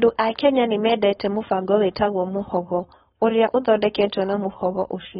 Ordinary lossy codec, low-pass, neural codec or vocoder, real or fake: AAC, 16 kbps; 7.2 kHz; codec, 16 kHz, 4.8 kbps, FACodec; fake